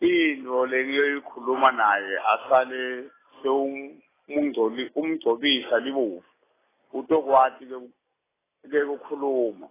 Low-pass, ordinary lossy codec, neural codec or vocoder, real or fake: 3.6 kHz; AAC, 16 kbps; none; real